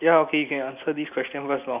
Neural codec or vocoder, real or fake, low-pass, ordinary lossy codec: none; real; 3.6 kHz; none